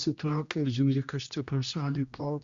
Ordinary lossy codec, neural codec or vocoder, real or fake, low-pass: Opus, 64 kbps; codec, 16 kHz, 1 kbps, X-Codec, HuBERT features, trained on general audio; fake; 7.2 kHz